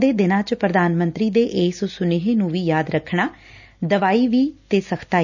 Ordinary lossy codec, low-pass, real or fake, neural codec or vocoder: none; 7.2 kHz; real; none